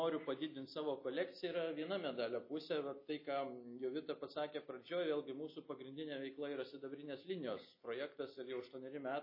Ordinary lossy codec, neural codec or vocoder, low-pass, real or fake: MP3, 24 kbps; none; 5.4 kHz; real